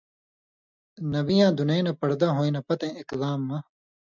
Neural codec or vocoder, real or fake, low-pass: none; real; 7.2 kHz